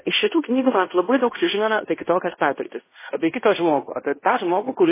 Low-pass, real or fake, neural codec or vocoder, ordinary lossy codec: 3.6 kHz; fake; codec, 16 kHz in and 24 kHz out, 0.9 kbps, LongCat-Audio-Codec, fine tuned four codebook decoder; MP3, 16 kbps